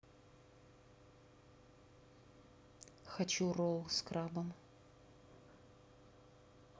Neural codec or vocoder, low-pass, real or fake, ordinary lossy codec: none; none; real; none